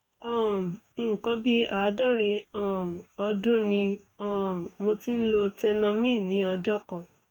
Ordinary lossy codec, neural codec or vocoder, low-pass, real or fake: none; codec, 44.1 kHz, 2.6 kbps, DAC; 19.8 kHz; fake